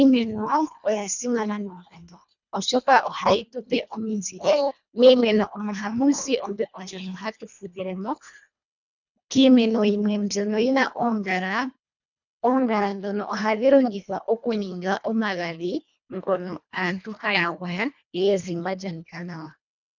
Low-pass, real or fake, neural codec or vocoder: 7.2 kHz; fake; codec, 24 kHz, 1.5 kbps, HILCodec